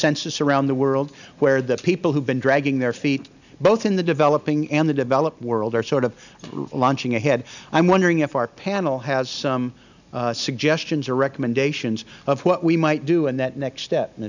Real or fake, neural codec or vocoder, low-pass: real; none; 7.2 kHz